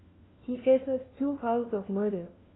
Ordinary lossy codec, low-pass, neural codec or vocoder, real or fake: AAC, 16 kbps; 7.2 kHz; codec, 16 kHz, 1 kbps, FunCodec, trained on LibriTTS, 50 frames a second; fake